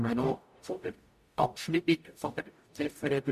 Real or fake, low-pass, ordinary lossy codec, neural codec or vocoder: fake; 14.4 kHz; MP3, 64 kbps; codec, 44.1 kHz, 0.9 kbps, DAC